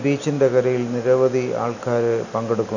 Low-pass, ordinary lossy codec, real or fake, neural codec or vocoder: 7.2 kHz; none; real; none